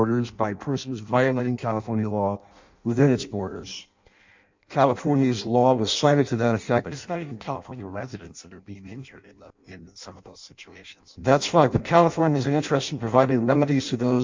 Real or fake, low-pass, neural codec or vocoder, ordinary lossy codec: fake; 7.2 kHz; codec, 16 kHz in and 24 kHz out, 0.6 kbps, FireRedTTS-2 codec; MP3, 64 kbps